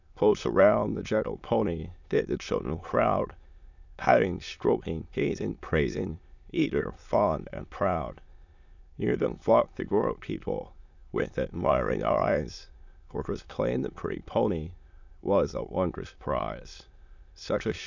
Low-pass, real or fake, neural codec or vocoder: 7.2 kHz; fake; autoencoder, 22.05 kHz, a latent of 192 numbers a frame, VITS, trained on many speakers